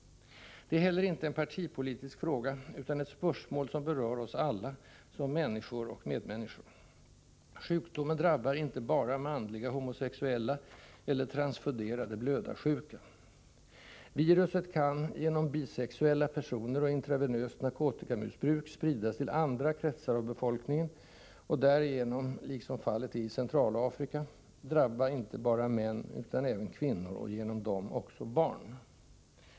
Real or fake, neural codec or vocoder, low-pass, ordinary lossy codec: real; none; none; none